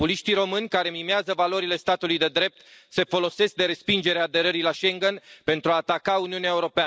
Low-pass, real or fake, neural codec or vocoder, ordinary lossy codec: none; real; none; none